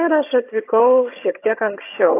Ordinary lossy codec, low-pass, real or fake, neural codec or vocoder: AAC, 24 kbps; 3.6 kHz; fake; vocoder, 22.05 kHz, 80 mel bands, HiFi-GAN